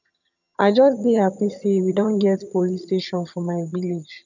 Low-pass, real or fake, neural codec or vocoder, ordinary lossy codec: 7.2 kHz; fake; vocoder, 22.05 kHz, 80 mel bands, HiFi-GAN; none